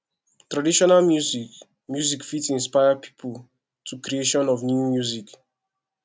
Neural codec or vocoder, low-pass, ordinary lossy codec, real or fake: none; none; none; real